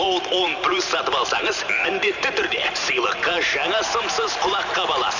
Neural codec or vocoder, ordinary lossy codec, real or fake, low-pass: vocoder, 44.1 kHz, 128 mel bands every 512 samples, BigVGAN v2; none; fake; 7.2 kHz